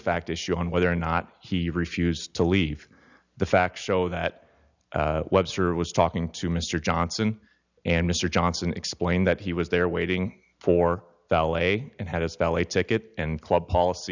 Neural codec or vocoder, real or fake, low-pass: none; real; 7.2 kHz